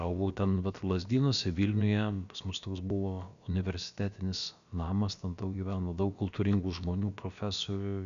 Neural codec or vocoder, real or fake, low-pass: codec, 16 kHz, about 1 kbps, DyCAST, with the encoder's durations; fake; 7.2 kHz